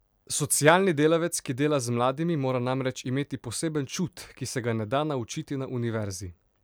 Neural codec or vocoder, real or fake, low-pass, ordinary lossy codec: none; real; none; none